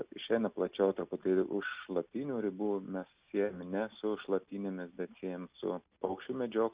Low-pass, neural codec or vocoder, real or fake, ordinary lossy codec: 3.6 kHz; none; real; Opus, 64 kbps